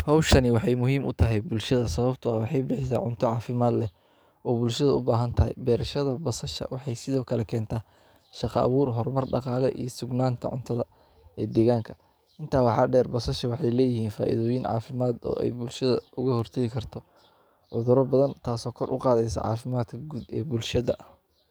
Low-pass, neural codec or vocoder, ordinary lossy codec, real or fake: none; codec, 44.1 kHz, 7.8 kbps, DAC; none; fake